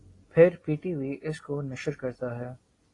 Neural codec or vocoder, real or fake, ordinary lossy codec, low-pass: none; real; AAC, 32 kbps; 10.8 kHz